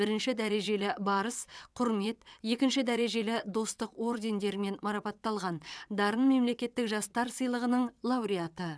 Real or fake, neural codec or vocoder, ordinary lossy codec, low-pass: fake; vocoder, 22.05 kHz, 80 mel bands, WaveNeXt; none; none